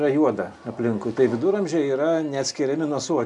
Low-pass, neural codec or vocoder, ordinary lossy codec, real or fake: 10.8 kHz; none; MP3, 96 kbps; real